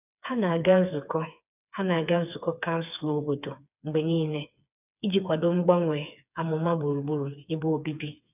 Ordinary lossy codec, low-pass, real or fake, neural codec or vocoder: none; 3.6 kHz; fake; codec, 16 kHz, 4 kbps, FreqCodec, smaller model